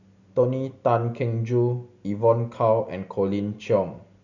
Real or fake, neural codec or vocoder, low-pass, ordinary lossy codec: real; none; 7.2 kHz; none